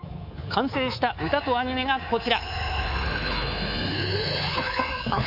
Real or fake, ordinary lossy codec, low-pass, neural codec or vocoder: fake; none; 5.4 kHz; codec, 24 kHz, 3.1 kbps, DualCodec